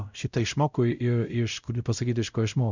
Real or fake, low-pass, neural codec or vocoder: fake; 7.2 kHz; codec, 16 kHz, 0.5 kbps, X-Codec, WavLM features, trained on Multilingual LibriSpeech